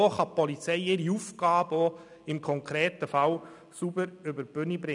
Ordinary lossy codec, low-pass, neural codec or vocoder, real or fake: none; 10.8 kHz; none; real